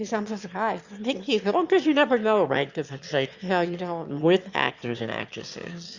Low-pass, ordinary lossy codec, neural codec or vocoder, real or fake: 7.2 kHz; Opus, 64 kbps; autoencoder, 22.05 kHz, a latent of 192 numbers a frame, VITS, trained on one speaker; fake